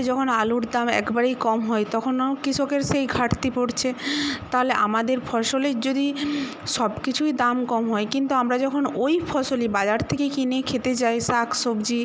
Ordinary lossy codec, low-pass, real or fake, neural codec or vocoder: none; none; real; none